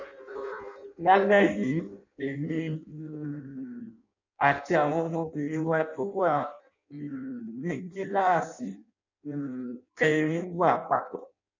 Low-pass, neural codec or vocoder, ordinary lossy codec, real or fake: 7.2 kHz; codec, 16 kHz in and 24 kHz out, 0.6 kbps, FireRedTTS-2 codec; none; fake